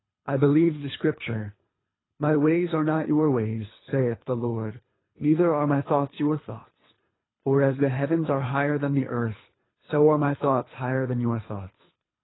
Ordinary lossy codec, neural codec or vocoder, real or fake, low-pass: AAC, 16 kbps; codec, 24 kHz, 3 kbps, HILCodec; fake; 7.2 kHz